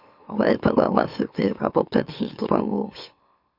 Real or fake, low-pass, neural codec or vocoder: fake; 5.4 kHz; autoencoder, 44.1 kHz, a latent of 192 numbers a frame, MeloTTS